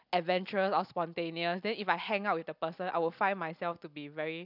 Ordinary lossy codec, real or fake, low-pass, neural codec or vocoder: none; real; 5.4 kHz; none